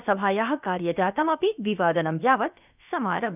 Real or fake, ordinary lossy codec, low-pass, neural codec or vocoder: fake; none; 3.6 kHz; codec, 16 kHz, about 1 kbps, DyCAST, with the encoder's durations